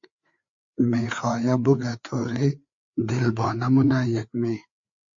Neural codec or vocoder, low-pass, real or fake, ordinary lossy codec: codec, 16 kHz, 4 kbps, FreqCodec, larger model; 7.2 kHz; fake; MP3, 48 kbps